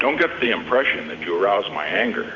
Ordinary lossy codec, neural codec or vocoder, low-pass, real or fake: Opus, 64 kbps; vocoder, 44.1 kHz, 128 mel bands every 512 samples, BigVGAN v2; 7.2 kHz; fake